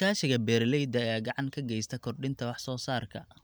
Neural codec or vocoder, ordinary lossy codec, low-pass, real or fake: none; none; none; real